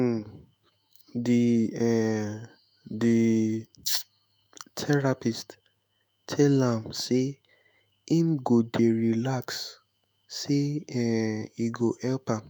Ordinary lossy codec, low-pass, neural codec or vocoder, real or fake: none; none; autoencoder, 48 kHz, 128 numbers a frame, DAC-VAE, trained on Japanese speech; fake